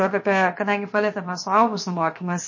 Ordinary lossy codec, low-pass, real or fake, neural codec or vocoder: MP3, 32 kbps; 7.2 kHz; fake; codec, 16 kHz, 0.7 kbps, FocalCodec